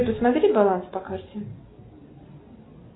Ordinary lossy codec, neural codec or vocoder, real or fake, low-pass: AAC, 16 kbps; none; real; 7.2 kHz